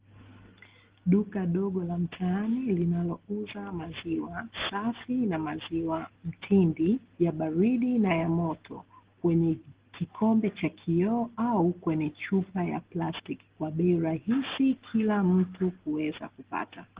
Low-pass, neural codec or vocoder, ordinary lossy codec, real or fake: 3.6 kHz; none; Opus, 16 kbps; real